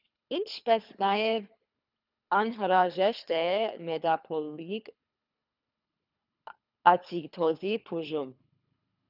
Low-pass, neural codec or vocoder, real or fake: 5.4 kHz; codec, 24 kHz, 3 kbps, HILCodec; fake